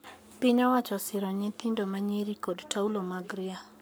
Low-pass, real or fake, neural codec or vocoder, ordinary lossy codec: none; fake; codec, 44.1 kHz, 7.8 kbps, Pupu-Codec; none